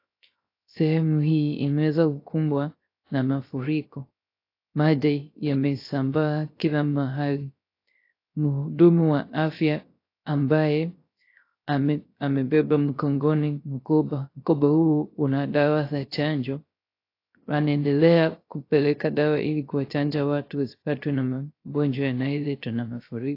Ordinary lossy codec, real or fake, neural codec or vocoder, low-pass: AAC, 32 kbps; fake; codec, 16 kHz, 0.3 kbps, FocalCodec; 5.4 kHz